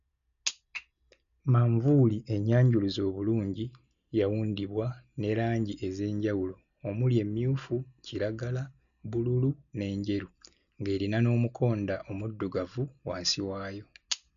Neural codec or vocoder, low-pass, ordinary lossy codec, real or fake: none; 7.2 kHz; none; real